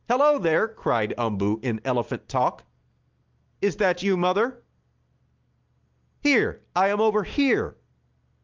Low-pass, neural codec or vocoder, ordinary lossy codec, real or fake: 7.2 kHz; none; Opus, 32 kbps; real